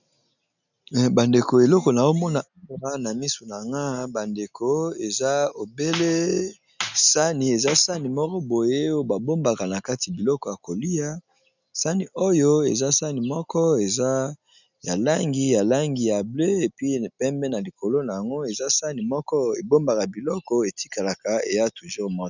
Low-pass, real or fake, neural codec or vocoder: 7.2 kHz; real; none